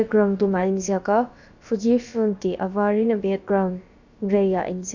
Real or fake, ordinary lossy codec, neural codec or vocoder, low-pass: fake; none; codec, 16 kHz, about 1 kbps, DyCAST, with the encoder's durations; 7.2 kHz